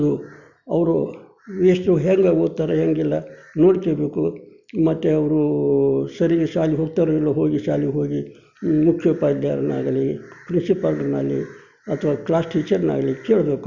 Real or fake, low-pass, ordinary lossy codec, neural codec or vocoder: real; 7.2 kHz; Opus, 64 kbps; none